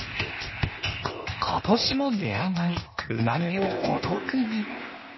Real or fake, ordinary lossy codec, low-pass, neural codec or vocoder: fake; MP3, 24 kbps; 7.2 kHz; codec, 16 kHz, 0.8 kbps, ZipCodec